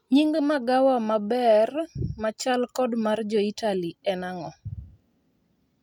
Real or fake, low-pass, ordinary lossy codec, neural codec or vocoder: real; 19.8 kHz; none; none